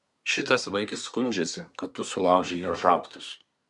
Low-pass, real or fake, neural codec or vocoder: 10.8 kHz; fake; codec, 24 kHz, 1 kbps, SNAC